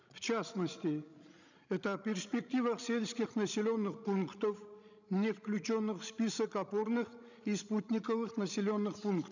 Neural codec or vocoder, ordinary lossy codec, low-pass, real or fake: codec, 16 kHz, 16 kbps, FreqCodec, larger model; none; 7.2 kHz; fake